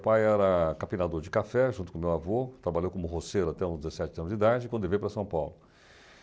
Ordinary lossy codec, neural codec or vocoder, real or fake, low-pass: none; none; real; none